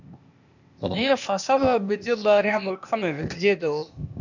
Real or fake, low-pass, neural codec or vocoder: fake; 7.2 kHz; codec, 16 kHz, 0.8 kbps, ZipCodec